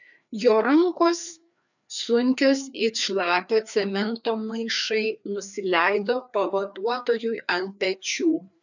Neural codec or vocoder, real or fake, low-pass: codec, 16 kHz, 2 kbps, FreqCodec, larger model; fake; 7.2 kHz